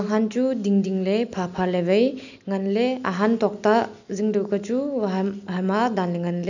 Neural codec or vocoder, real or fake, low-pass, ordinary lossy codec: none; real; 7.2 kHz; none